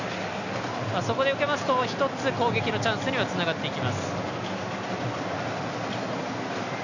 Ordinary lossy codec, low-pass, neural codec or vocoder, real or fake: none; 7.2 kHz; none; real